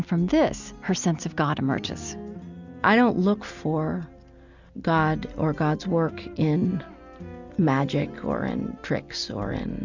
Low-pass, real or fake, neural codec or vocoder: 7.2 kHz; real; none